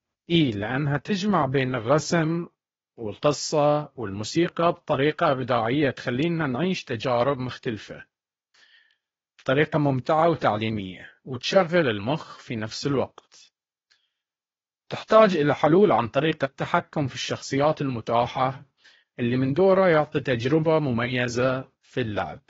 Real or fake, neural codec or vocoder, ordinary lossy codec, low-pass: fake; codec, 16 kHz, 0.8 kbps, ZipCodec; AAC, 24 kbps; 7.2 kHz